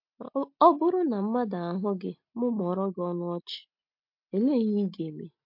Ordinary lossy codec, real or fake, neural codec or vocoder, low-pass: MP3, 48 kbps; real; none; 5.4 kHz